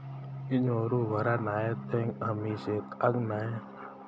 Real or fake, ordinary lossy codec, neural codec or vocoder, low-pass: real; none; none; none